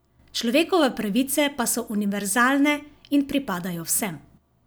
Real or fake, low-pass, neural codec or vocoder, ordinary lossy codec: real; none; none; none